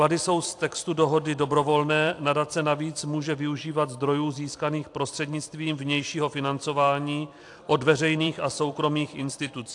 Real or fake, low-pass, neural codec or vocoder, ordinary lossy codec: fake; 10.8 kHz; vocoder, 44.1 kHz, 128 mel bands every 256 samples, BigVGAN v2; AAC, 64 kbps